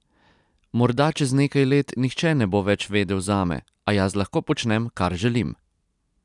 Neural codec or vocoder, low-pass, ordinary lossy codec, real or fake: none; 10.8 kHz; none; real